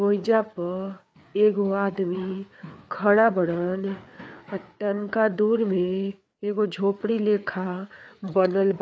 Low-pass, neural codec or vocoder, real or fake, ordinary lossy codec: none; codec, 16 kHz, 4 kbps, FreqCodec, larger model; fake; none